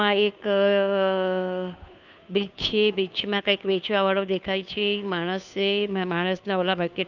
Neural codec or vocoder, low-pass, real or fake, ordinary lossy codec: codec, 16 kHz, 2 kbps, FunCodec, trained on Chinese and English, 25 frames a second; 7.2 kHz; fake; none